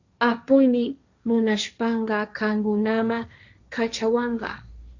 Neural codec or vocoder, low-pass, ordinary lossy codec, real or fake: codec, 16 kHz, 1.1 kbps, Voila-Tokenizer; 7.2 kHz; Opus, 64 kbps; fake